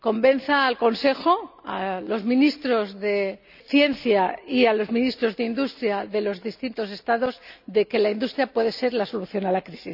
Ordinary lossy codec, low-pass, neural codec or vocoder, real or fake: none; 5.4 kHz; none; real